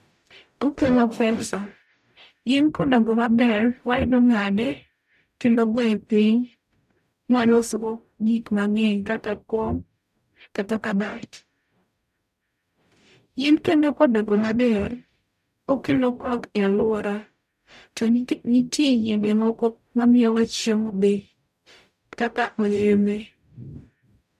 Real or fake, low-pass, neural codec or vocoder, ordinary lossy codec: fake; 14.4 kHz; codec, 44.1 kHz, 0.9 kbps, DAC; none